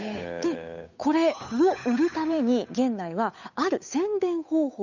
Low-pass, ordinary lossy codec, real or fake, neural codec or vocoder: 7.2 kHz; none; fake; codec, 16 kHz, 8 kbps, FunCodec, trained on Chinese and English, 25 frames a second